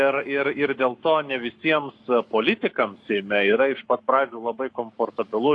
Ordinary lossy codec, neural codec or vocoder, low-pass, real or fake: AAC, 48 kbps; codec, 44.1 kHz, 7.8 kbps, Pupu-Codec; 10.8 kHz; fake